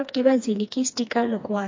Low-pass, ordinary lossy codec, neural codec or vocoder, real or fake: 7.2 kHz; MP3, 48 kbps; codec, 16 kHz, 2 kbps, FreqCodec, smaller model; fake